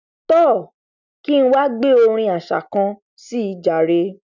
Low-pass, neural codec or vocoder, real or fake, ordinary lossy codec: 7.2 kHz; none; real; none